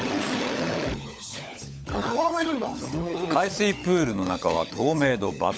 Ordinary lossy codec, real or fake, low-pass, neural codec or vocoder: none; fake; none; codec, 16 kHz, 16 kbps, FunCodec, trained on LibriTTS, 50 frames a second